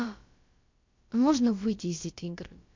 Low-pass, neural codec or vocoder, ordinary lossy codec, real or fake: 7.2 kHz; codec, 16 kHz, about 1 kbps, DyCAST, with the encoder's durations; MP3, 48 kbps; fake